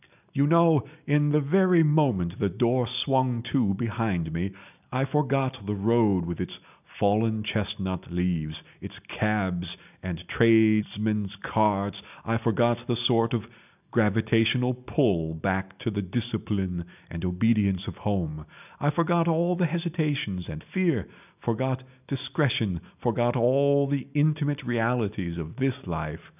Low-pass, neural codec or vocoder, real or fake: 3.6 kHz; none; real